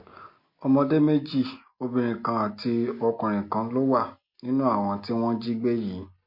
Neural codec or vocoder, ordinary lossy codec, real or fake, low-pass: none; MP3, 32 kbps; real; 5.4 kHz